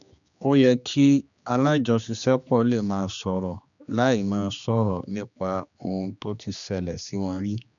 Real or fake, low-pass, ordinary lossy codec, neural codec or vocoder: fake; 7.2 kHz; none; codec, 16 kHz, 2 kbps, X-Codec, HuBERT features, trained on general audio